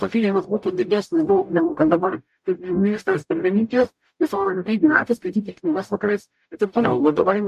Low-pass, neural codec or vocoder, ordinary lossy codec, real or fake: 14.4 kHz; codec, 44.1 kHz, 0.9 kbps, DAC; MP3, 64 kbps; fake